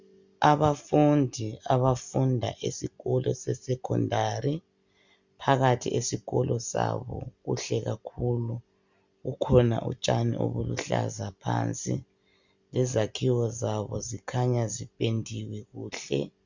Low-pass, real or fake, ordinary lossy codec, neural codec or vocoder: 7.2 kHz; real; Opus, 64 kbps; none